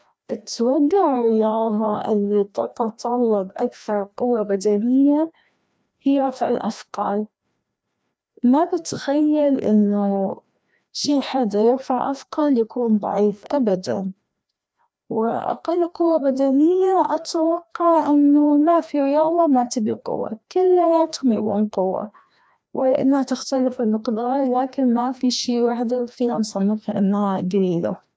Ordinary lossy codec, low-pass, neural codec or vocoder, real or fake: none; none; codec, 16 kHz, 1 kbps, FreqCodec, larger model; fake